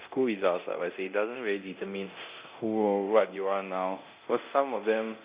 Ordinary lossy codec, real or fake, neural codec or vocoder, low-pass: Opus, 64 kbps; fake; codec, 24 kHz, 0.9 kbps, DualCodec; 3.6 kHz